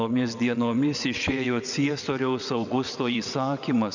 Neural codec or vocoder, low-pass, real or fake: vocoder, 22.05 kHz, 80 mel bands, WaveNeXt; 7.2 kHz; fake